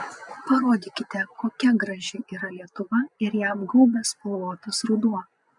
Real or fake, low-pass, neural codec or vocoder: fake; 10.8 kHz; vocoder, 24 kHz, 100 mel bands, Vocos